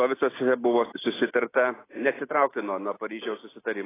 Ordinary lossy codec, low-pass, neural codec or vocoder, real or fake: AAC, 16 kbps; 3.6 kHz; none; real